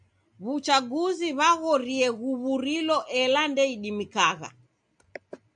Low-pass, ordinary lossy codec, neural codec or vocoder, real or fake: 9.9 kHz; MP3, 96 kbps; none; real